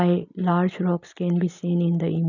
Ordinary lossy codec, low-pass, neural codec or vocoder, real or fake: none; 7.2 kHz; none; real